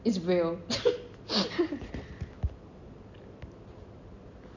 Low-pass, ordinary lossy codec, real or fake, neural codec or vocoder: 7.2 kHz; none; real; none